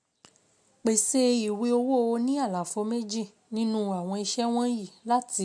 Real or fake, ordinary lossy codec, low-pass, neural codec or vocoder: real; MP3, 64 kbps; 9.9 kHz; none